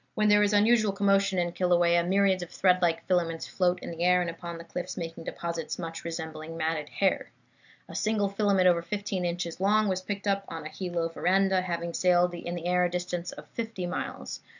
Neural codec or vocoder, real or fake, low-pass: none; real; 7.2 kHz